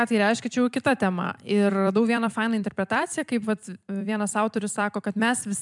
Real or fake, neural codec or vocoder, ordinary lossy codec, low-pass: fake; vocoder, 44.1 kHz, 128 mel bands every 256 samples, BigVGAN v2; MP3, 96 kbps; 10.8 kHz